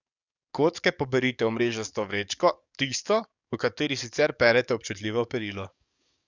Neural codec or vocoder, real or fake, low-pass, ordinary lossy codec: codec, 44.1 kHz, 7.8 kbps, DAC; fake; 7.2 kHz; none